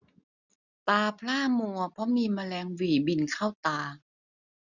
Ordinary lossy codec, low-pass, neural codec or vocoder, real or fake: none; 7.2 kHz; none; real